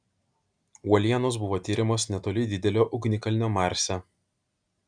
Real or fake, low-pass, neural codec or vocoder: real; 9.9 kHz; none